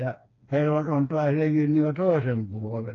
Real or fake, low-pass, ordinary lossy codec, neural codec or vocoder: fake; 7.2 kHz; AAC, 32 kbps; codec, 16 kHz, 2 kbps, FreqCodec, smaller model